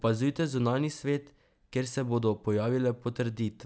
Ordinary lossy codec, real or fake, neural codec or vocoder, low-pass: none; real; none; none